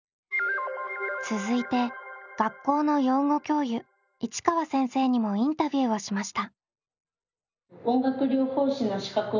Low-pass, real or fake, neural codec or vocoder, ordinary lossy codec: 7.2 kHz; real; none; none